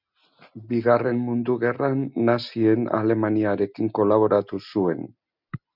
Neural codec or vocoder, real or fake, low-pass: none; real; 5.4 kHz